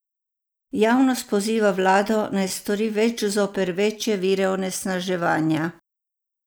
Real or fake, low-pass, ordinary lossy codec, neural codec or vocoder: real; none; none; none